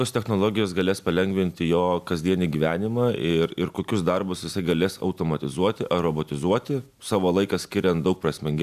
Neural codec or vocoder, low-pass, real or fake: none; 14.4 kHz; real